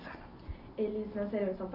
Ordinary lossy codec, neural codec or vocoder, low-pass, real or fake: none; none; 5.4 kHz; real